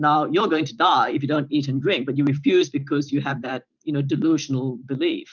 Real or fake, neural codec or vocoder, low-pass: fake; vocoder, 44.1 kHz, 128 mel bands every 512 samples, BigVGAN v2; 7.2 kHz